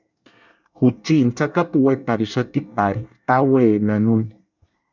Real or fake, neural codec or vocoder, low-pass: fake; codec, 24 kHz, 1 kbps, SNAC; 7.2 kHz